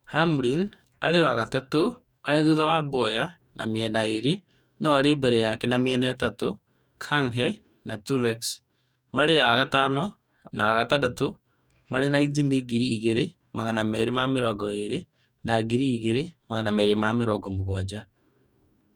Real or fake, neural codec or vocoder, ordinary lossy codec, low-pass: fake; codec, 44.1 kHz, 2.6 kbps, DAC; none; 19.8 kHz